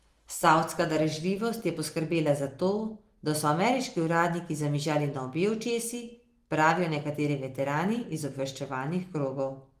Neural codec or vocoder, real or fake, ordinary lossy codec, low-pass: none; real; Opus, 24 kbps; 14.4 kHz